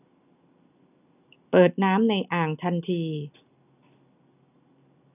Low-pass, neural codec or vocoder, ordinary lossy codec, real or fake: 3.6 kHz; none; none; real